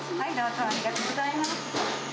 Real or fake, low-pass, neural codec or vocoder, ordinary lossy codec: real; none; none; none